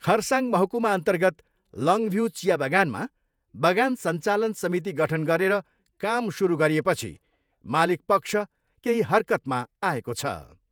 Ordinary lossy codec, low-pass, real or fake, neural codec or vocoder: none; none; fake; vocoder, 48 kHz, 128 mel bands, Vocos